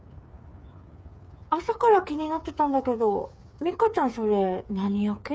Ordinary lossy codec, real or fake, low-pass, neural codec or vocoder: none; fake; none; codec, 16 kHz, 8 kbps, FreqCodec, smaller model